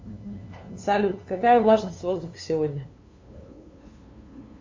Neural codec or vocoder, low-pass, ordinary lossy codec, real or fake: codec, 16 kHz, 2 kbps, FunCodec, trained on LibriTTS, 25 frames a second; 7.2 kHz; MP3, 48 kbps; fake